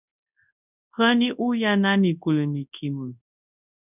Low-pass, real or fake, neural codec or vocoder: 3.6 kHz; fake; codec, 24 kHz, 0.9 kbps, WavTokenizer, large speech release